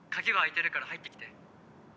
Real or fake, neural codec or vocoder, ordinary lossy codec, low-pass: real; none; none; none